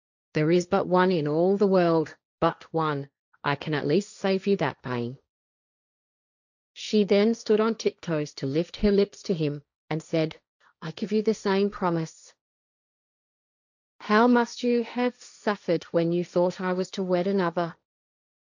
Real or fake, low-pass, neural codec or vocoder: fake; 7.2 kHz; codec, 16 kHz, 1.1 kbps, Voila-Tokenizer